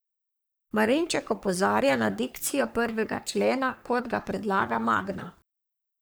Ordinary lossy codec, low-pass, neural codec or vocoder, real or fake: none; none; codec, 44.1 kHz, 3.4 kbps, Pupu-Codec; fake